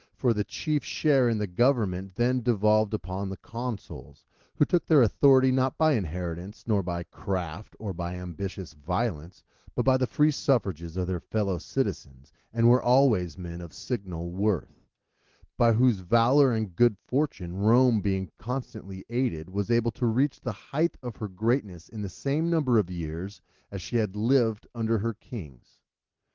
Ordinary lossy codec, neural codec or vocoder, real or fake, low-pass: Opus, 16 kbps; none; real; 7.2 kHz